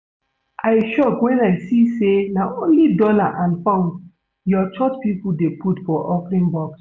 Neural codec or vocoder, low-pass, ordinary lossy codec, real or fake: none; none; none; real